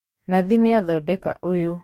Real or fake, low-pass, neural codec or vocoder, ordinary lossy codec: fake; 19.8 kHz; codec, 44.1 kHz, 2.6 kbps, DAC; MP3, 64 kbps